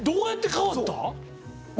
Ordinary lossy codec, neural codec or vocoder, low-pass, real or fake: none; none; none; real